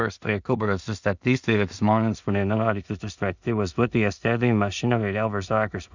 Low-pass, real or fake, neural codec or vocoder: 7.2 kHz; fake; codec, 16 kHz in and 24 kHz out, 0.4 kbps, LongCat-Audio-Codec, two codebook decoder